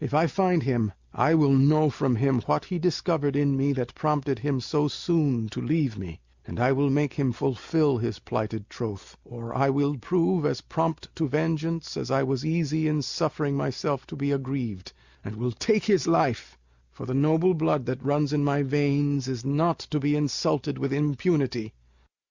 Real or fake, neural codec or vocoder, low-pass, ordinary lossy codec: real; none; 7.2 kHz; Opus, 64 kbps